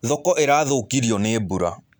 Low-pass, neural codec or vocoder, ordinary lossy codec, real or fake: none; none; none; real